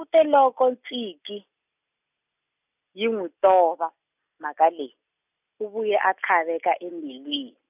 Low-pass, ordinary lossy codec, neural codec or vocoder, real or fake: 3.6 kHz; none; none; real